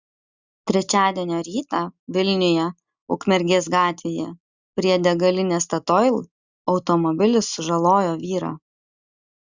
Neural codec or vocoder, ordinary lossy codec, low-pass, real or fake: none; Opus, 64 kbps; 7.2 kHz; real